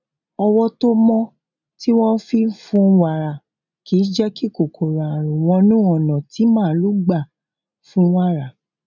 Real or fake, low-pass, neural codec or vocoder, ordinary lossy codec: real; 7.2 kHz; none; none